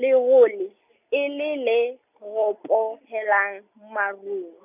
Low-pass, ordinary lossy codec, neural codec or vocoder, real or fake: 3.6 kHz; none; none; real